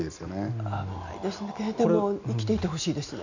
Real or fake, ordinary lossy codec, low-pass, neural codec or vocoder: real; none; 7.2 kHz; none